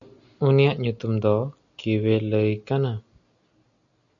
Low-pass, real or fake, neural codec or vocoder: 7.2 kHz; real; none